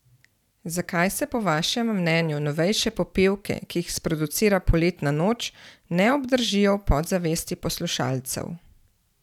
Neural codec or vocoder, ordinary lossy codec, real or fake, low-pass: vocoder, 44.1 kHz, 128 mel bands every 512 samples, BigVGAN v2; none; fake; 19.8 kHz